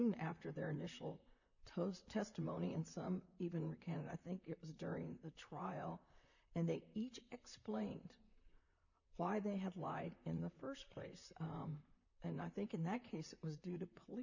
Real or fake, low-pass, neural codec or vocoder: fake; 7.2 kHz; vocoder, 44.1 kHz, 128 mel bands, Pupu-Vocoder